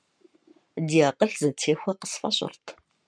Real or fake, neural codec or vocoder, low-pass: fake; codec, 44.1 kHz, 7.8 kbps, Pupu-Codec; 9.9 kHz